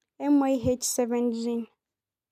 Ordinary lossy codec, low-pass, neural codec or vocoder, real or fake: none; 14.4 kHz; none; real